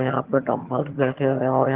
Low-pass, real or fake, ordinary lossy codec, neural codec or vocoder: 3.6 kHz; fake; Opus, 16 kbps; vocoder, 22.05 kHz, 80 mel bands, HiFi-GAN